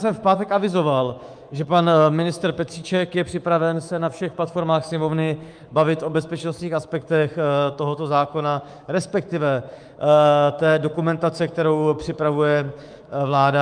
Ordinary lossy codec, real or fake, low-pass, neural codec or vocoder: Opus, 32 kbps; fake; 9.9 kHz; codec, 24 kHz, 3.1 kbps, DualCodec